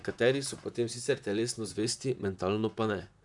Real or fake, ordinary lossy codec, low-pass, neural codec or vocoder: fake; none; 10.8 kHz; vocoder, 44.1 kHz, 128 mel bands, Pupu-Vocoder